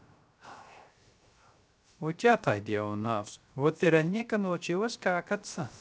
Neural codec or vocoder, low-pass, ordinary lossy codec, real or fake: codec, 16 kHz, 0.3 kbps, FocalCodec; none; none; fake